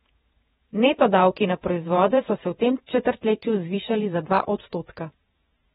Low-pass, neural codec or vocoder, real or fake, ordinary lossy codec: 19.8 kHz; vocoder, 48 kHz, 128 mel bands, Vocos; fake; AAC, 16 kbps